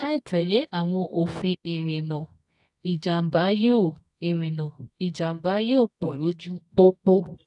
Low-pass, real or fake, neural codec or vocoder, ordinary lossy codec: 10.8 kHz; fake; codec, 24 kHz, 0.9 kbps, WavTokenizer, medium music audio release; none